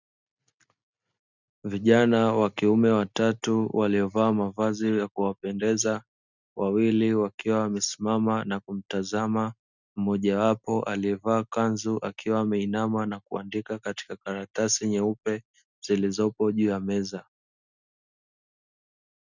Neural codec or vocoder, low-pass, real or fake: none; 7.2 kHz; real